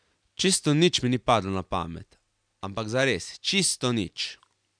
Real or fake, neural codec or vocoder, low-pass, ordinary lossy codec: real; none; 9.9 kHz; none